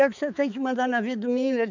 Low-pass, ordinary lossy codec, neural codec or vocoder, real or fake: 7.2 kHz; none; codec, 16 kHz, 4 kbps, X-Codec, HuBERT features, trained on balanced general audio; fake